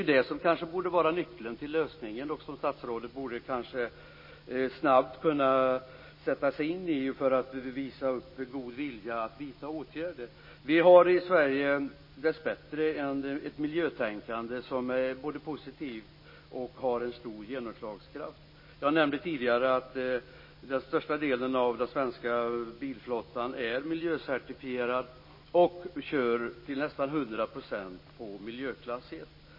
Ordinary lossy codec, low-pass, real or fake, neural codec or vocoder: MP3, 24 kbps; 5.4 kHz; real; none